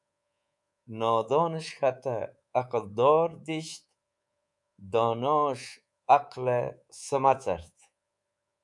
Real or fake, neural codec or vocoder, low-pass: fake; codec, 24 kHz, 3.1 kbps, DualCodec; 10.8 kHz